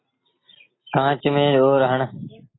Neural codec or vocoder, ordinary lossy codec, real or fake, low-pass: none; AAC, 16 kbps; real; 7.2 kHz